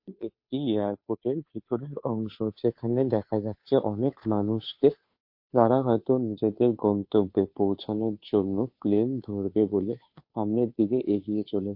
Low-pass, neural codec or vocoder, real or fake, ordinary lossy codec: 5.4 kHz; codec, 16 kHz, 2 kbps, FunCodec, trained on Chinese and English, 25 frames a second; fake; MP3, 32 kbps